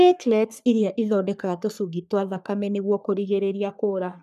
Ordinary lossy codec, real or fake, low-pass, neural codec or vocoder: none; fake; 14.4 kHz; codec, 44.1 kHz, 3.4 kbps, Pupu-Codec